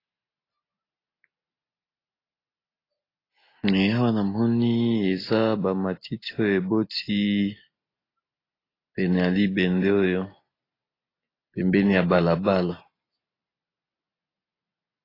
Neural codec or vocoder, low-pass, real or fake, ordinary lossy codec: none; 5.4 kHz; real; AAC, 24 kbps